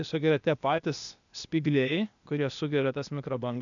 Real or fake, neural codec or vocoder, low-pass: fake; codec, 16 kHz, 0.8 kbps, ZipCodec; 7.2 kHz